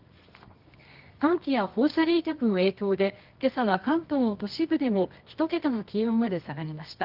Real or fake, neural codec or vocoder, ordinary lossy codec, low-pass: fake; codec, 24 kHz, 0.9 kbps, WavTokenizer, medium music audio release; Opus, 32 kbps; 5.4 kHz